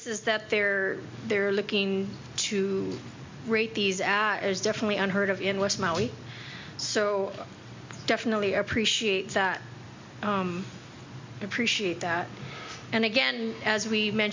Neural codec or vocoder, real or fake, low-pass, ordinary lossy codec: none; real; 7.2 kHz; MP3, 64 kbps